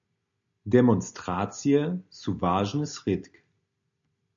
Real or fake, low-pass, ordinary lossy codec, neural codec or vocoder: real; 7.2 kHz; MP3, 64 kbps; none